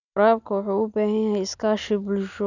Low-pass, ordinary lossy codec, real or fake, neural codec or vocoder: 7.2 kHz; none; real; none